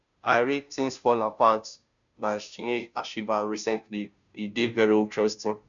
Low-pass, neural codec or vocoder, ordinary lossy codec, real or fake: 7.2 kHz; codec, 16 kHz, 0.5 kbps, FunCodec, trained on Chinese and English, 25 frames a second; none; fake